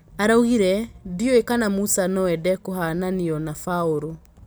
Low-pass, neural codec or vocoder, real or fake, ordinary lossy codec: none; none; real; none